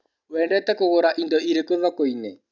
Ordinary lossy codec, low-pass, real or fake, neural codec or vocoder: none; 7.2 kHz; real; none